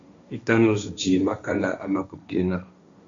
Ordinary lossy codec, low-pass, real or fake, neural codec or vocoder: AAC, 64 kbps; 7.2 kHz; fake; codec, 16 kHz, 1.1 kbps, Voila-Tokenizer